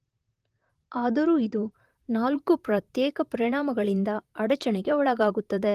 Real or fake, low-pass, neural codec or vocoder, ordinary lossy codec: fake; 14.4 kHz; vocoder, 44.1 kHz, 128 mel bands, Pupu-Vocoder; Opus, 24 kbps